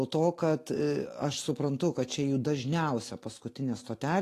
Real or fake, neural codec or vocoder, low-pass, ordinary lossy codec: real; none; 14.4 kHz; AAC, 48 kbps